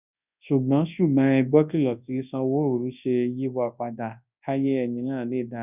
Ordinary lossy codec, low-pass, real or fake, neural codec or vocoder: none; 3.6 kHz; fake; codec, 24 kHz, 0.9 kbps, WavTokenizer, large speech release